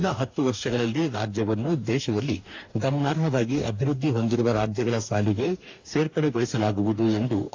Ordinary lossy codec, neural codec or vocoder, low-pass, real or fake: AAC, 48 kbps; codec, 44.1 kHz, 2.6 kbps, DAC; 7.2 kHz; fake